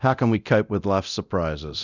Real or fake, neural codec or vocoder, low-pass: fake; codec, 24 kHz, 0.9 kbps, DualCodec; 7.2 kHz